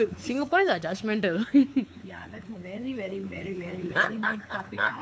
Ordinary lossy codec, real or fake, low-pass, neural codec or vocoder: none; fake; none; codec, 16 kHz, 4 kbps, X-Codec, WavLM features, trained on Multilingual LibriSpeech